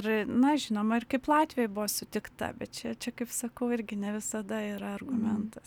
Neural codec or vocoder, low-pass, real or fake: none; 19.8 kHz; real